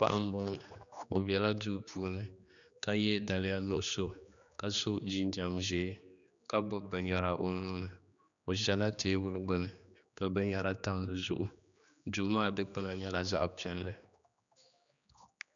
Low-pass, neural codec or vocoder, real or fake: 7.2 kHz; codec, 16 kHz, 2 kbps, X-Codec, HuBERT features, trained on general audio; fake